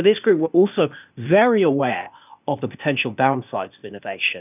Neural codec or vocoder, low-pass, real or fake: codec, 16 kHz, 0.8 kbps, ZipCodec; 3.6 kHz; fake